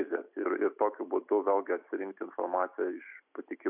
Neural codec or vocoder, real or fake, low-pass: none; real; 3.6 kHz